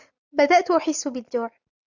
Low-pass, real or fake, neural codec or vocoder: 7.2 kHz; real; none